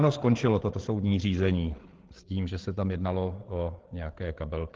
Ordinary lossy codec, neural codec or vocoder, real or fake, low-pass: Opus, 16 kbps; codec, 16 kHz, 8 kbps, FreqCodec, larger model; fake; 7.2 kHz